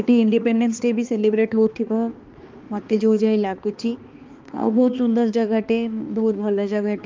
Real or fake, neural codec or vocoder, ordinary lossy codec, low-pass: fake; codec, 16 kHz, 2 kbps, X-Codec, HuBERT features, trained on balanced general audio; Opus, 24 kbps; 7.2 kHz